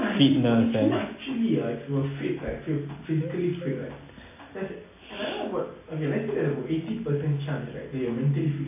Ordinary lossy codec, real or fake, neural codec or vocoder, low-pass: MP3, 24 kbps; real; none; 3.6 kHz